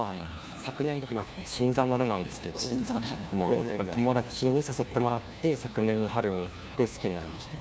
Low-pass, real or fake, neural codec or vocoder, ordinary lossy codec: none; fake; codec, 16 kHz, 1 kbps, FunCodec, trained on LibriTTS, 50 frames a second; none